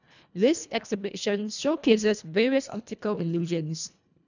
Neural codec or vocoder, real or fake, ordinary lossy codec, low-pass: codec, 24 kHz, 1.5 kbps, HILCodec; fake; none; 7.2 kHz